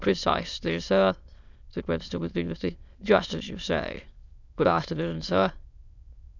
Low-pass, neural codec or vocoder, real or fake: 7.2 kHz; autoencoder, 22.05 kHz, a latent of 192 numbers a frame, VITS, trained on many speakers; fake